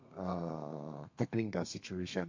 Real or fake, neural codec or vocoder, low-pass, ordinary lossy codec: fake; codec, 32 kHz, 1.9 kbps, SNAC; 7.2 kHz; MP3, 48 kbps